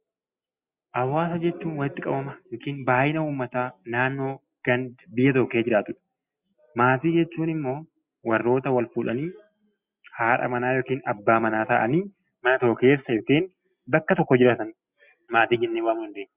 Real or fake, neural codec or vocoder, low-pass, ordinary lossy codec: real; none; 3.6 kHz; Opus, 64 kbps